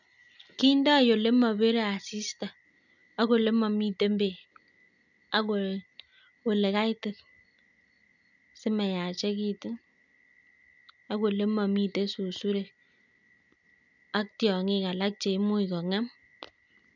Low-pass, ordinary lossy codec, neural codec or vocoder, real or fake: 7.2 kHz; none; none; real